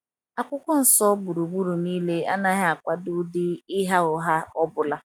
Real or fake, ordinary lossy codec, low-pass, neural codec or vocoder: real; none; 19.8 kHz; none